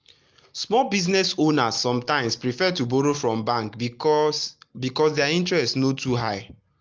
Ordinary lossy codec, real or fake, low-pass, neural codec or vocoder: Opus, 24 kbps; real; 7.2 kHz; none